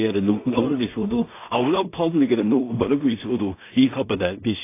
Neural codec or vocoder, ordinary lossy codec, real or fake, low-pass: codec, 16 kHz in and 24 kHz out, 0.4 kbps, LongCat-Audio-Codec, two codebook decoder; AAC, 24 kbps; fake; 3.6 kHz